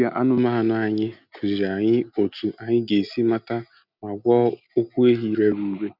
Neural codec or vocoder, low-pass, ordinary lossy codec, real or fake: none; 5.4 kHz; none; real